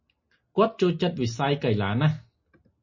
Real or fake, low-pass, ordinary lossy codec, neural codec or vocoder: real; 7.2 kHz; MP3, 32 kbps; none